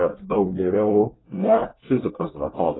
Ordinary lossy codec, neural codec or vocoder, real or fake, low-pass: AAC, 16 kbps; codec, 24 kHz, 1 kbps, SNAC; fake; 7.2 kHz